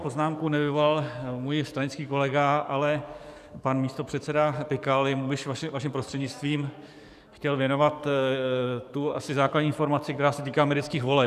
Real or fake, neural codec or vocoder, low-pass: fake; codec, 44.1 kHz, 7.8 kbps, DAC; 14.4 kHz